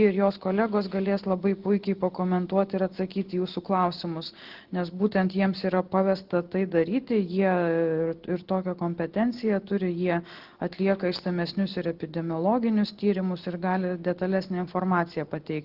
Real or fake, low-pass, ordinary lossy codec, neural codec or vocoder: real; 5.4 kHz; Opus, 16 kbps; none